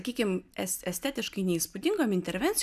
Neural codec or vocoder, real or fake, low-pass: none; real; 14.4 kHz